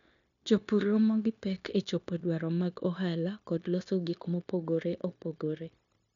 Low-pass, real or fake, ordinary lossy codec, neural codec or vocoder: 7.2 kHz; fake; none; codec, 16 kHz, 0.9 kbps, LongCat-Audio-Codec